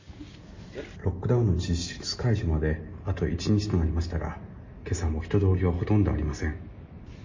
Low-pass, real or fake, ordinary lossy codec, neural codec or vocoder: 7.2 kHz; real; MP3, 32 kbps; none